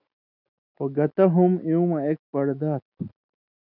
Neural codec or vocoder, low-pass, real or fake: none; 5.4 kHz; real